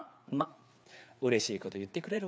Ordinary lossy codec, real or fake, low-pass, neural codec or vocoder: none; fake; none; codec, 16 kHz, 4 kbps, FreqCodec, larger model